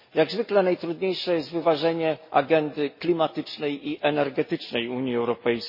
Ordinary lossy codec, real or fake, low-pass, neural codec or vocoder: MP3, 24 kbps; real; 5.4 kHz; none